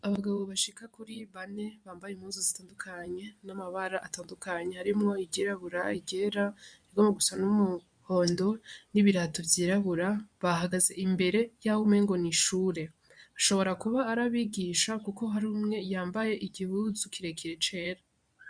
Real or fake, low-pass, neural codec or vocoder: fake; 9.9 kHz; vocoder, 24 kHz, 100 mel bands, Vocos